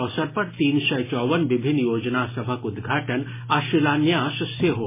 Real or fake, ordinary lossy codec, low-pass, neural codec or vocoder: real; MP3, 16 kbps; 3.6 kHz; none